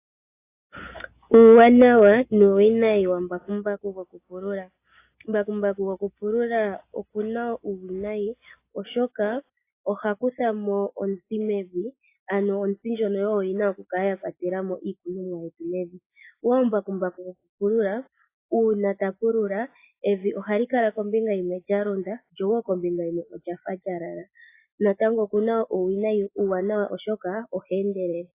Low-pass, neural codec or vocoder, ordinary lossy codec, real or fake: 3.6 kHz; none; AAC, 24 kbps; real